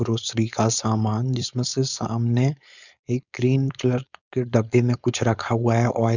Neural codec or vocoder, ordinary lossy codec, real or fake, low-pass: codec, 16 kHz, 4.8 kbps, FACodec; none; fake; 7.2 kHz